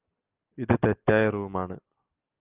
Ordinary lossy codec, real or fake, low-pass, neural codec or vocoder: Opus, 24 kbps; real; 3.6 kHz; none